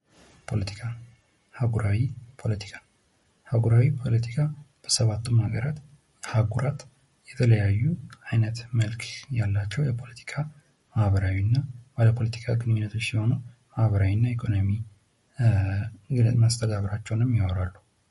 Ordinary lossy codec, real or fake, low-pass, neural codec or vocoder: MP3, 48 kbps; real; 19.8 kHz; none